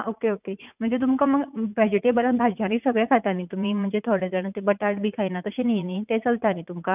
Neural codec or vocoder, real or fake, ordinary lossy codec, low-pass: vocoder, 44.1 kHz, 80 mel bands, Vocos; fake; none; 3.6 kHz